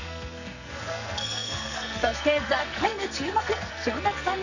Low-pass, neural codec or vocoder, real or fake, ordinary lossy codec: 7.2 kHz; codec, 44.1 kHz, 2.6 kbps, SNAC; fake; none